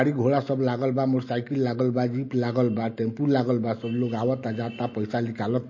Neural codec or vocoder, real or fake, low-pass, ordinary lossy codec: none; real; 7.2 kHz; MP3, 32 kbps